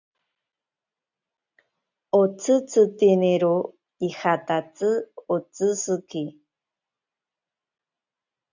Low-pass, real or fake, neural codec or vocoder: 7.2 kHz; real; none